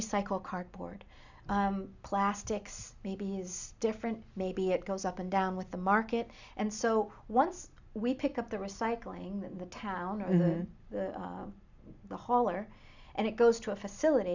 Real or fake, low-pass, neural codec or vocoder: real; 7.2 kHz; none